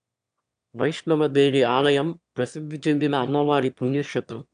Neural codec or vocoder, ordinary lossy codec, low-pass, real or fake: autoencoder, 22.05 kHz, a latent of 192 numbers a frame, VITS, trained on one speaker; AAC, 96 kbps; 9.9 kHz; fake